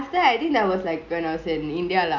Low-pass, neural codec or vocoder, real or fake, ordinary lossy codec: 7.2 kHz; none; real; none